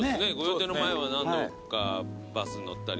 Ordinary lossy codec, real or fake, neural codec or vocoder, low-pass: none; real; none; none